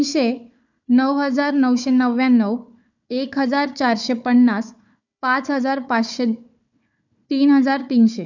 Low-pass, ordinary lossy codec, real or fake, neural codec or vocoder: 7.2 kHz; none; fake; codec, 16 kHz, 4 kbps, FunCodec, trained on Chinese and English, 50 frames a second